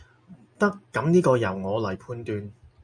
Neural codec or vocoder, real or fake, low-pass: none; real; 9.9 kHz